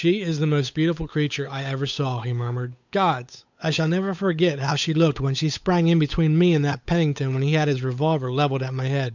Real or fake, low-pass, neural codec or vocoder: fake; 7.2 kHz; codec, 16 kHz, 8 kbps, FunCodec, trained on Chinese and English, 25 frames a second